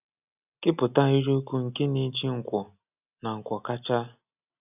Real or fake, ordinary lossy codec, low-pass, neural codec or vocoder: real; none; 3.6 kHz; none